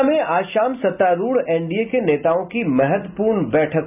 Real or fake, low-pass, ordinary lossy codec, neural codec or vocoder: real; 3.6 kHz; none; none